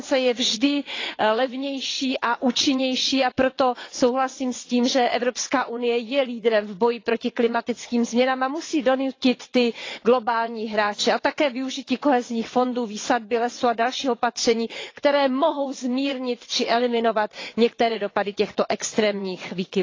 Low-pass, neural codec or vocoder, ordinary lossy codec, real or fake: 7.2 kHz; vocoder, 22.05 kHz, 80 mel bands, WaveNeXt; AAC, 32 kbps; fake